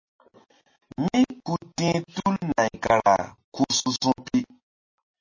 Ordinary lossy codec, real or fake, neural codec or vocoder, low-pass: MP3, 32 kbps; real; none; 7.2 kHz